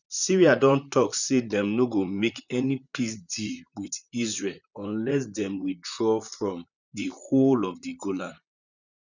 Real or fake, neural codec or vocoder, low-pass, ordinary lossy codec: fake; vocoder, 44.1 kHz, 128 mel bands, Pupu-Vocoder; 7.2 kHz; none